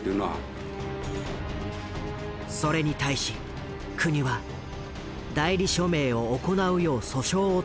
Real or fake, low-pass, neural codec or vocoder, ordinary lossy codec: real; none; none; none